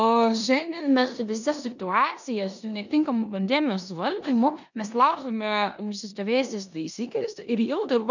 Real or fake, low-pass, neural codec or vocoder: fake; 7.2 kHz; codec, 16 kHz in and 24 kHz out, 0.9 kbps, LongCat-Audio-Codec, four codebook decoder